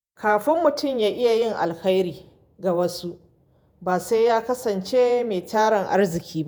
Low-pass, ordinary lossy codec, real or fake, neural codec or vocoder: none; none; fake; vocoder, 48 kHz, 128 mel bands, Vocos